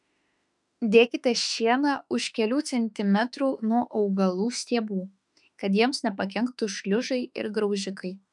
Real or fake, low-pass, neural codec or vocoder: fake; 10.8 kHz; autoencoder, 48 kHz, 32 numbers a frame, DAC-VAE, trained on Japanese speech